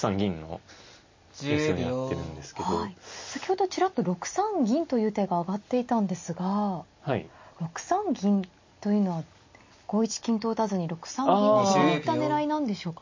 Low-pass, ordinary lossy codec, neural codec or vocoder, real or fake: 7.2 kHz; MP3, 32 kbps; none; real